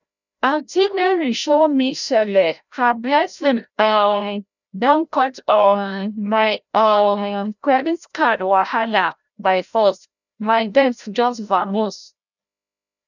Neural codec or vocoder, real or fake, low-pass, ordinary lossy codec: codec, 16 kHz, 0.5 kbps, FreqCodec, larger model; fake; 7.2 kHz; none